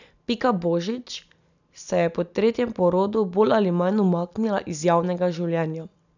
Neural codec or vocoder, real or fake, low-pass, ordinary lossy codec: none; real; 7.2 kHz; none